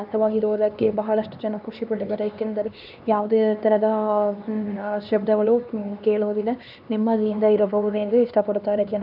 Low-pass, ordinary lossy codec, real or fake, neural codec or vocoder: 5.4 kHz; none; fake; codec, 16 kHz, 2 kbps, X-Codec, HuBERT features, trained on LibriSpeech